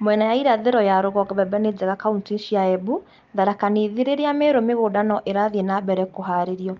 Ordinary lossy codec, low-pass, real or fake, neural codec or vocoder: Opus, 24 kbps; 7.2 kHz; real; none